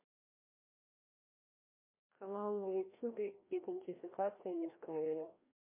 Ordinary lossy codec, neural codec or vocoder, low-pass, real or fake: MP3, 24 kbps; codec, 16 kHz, 1 kbps, FreqCodec, larger model; 3.6 kHz; fake